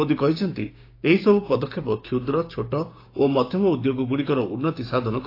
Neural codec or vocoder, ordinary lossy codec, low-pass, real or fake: codec, 16 kHz, 6 kbps, DAC; AAC, 32 kbps; 5.4 kHz; fake